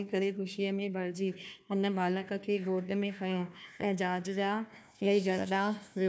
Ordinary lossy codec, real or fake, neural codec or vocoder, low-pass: none; fake; codec, 16 kHz, 1 kbps, FunCodec, trained on Chinese and English, 50 frames a second; none